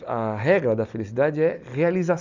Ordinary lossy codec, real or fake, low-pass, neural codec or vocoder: none; real; 7.2 kHz; none